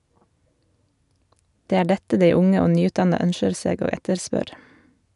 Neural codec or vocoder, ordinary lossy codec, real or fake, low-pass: none; none; real; 10.8 kHz